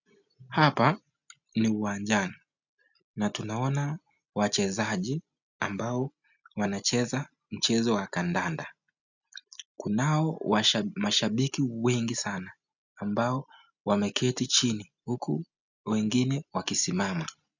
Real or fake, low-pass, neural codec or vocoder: real; 7.2 kHz; none